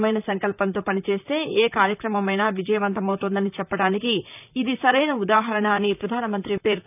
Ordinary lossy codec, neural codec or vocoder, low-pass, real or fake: none; vocoder, 44.1 kHz, 128 mel bands, Pupu-Vocoder; 3.6 kHz; fake